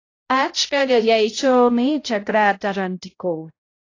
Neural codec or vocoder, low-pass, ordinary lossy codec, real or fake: codec, 16 kHz, 0.5 kbps, X-Codec, HuBERT features, trained on balanced general audio; 7.2 kHz; AAC, 32 kbps; fake